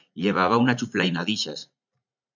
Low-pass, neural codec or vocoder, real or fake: 7.2 kHz; vocoder, 44.1 kHz, 80 mel bands, Vocos; fake